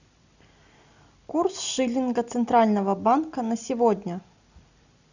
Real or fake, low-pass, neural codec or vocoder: real; 7.2 kHz; none